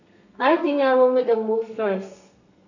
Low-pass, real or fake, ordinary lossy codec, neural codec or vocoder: 7.2 kHz; fake; none; codec, 32 kHz, 1.9 kbps, SNAC